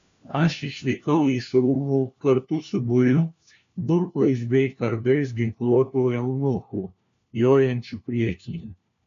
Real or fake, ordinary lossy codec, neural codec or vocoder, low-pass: fake; MP3, 64 kbps; codec, 16 kHz, 1 kbps, FunCodec, trained on LibriTTS, 50 frames a second; 7.2 kHz